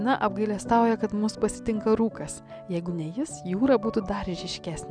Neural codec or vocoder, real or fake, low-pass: none; real; 9.9 kHz